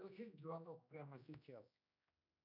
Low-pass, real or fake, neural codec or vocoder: 5.4 kHz; fake; codec, 16 kHz, 1 kbps, X-Codec, HuBERT features, trained on general audio